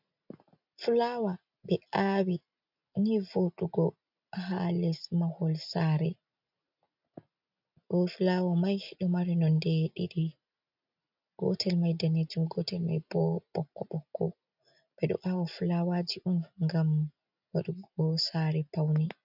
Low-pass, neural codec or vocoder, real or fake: 5.4 kHz; none; real